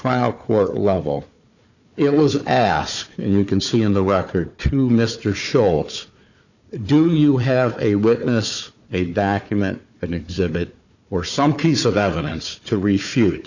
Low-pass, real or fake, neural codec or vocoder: 7.2 kHz; fake; codec, 16 kHz, 4 kbps, FunCodec, trained on Chinese and English, 50 frames a second